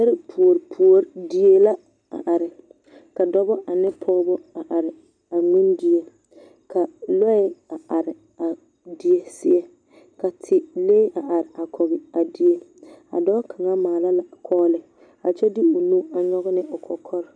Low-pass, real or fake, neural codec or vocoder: 9.9 kHz; real; none